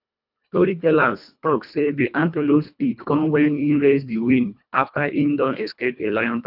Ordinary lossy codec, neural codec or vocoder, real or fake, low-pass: none; codec, 24 kHz, 1.5 kbps, HILCodec; fake; 5.4 kHz